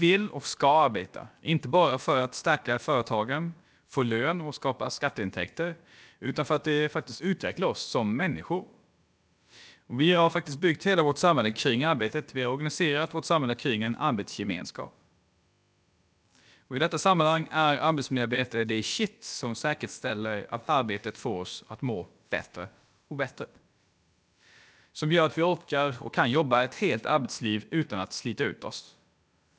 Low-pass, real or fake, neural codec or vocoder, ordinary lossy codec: none; fake; codec, 16 kHz, about 1 kbps, DyCAST, with the encoder's durations; none